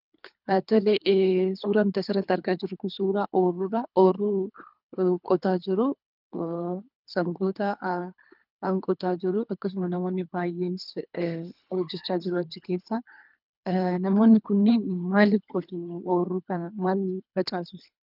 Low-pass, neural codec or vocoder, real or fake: 5.4 kHz; codec, 24 kHz, 3 kbps, HILCodec; fake